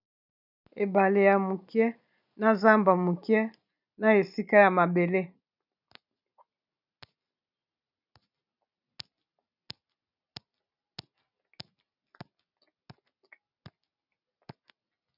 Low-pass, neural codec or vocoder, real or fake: 5.4 kHz; none; real